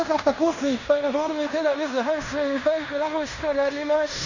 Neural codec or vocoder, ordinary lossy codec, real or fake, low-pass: codec, 16 kHz in and 24 kHz out, 0.9 kbps, LongCat-Audio-Codec, fine tuned four codebook decoder; none; fake; 7.2 kHz